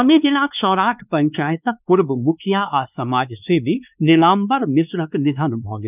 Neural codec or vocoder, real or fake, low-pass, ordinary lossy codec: codec, 16 kHz, 2 kbps, X-Codec, WavLM features, trained on Multilingual LibriSpeech; fake; 3.6 kHz; none